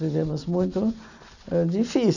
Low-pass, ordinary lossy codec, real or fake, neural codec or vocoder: 7.2 kHz; none; real; none